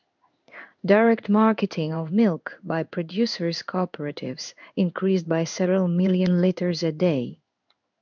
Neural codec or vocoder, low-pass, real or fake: codec, 16 kHz in and 24 kHz out, 1 kbps, XY-Tokenizer; 7.2 kHz; fake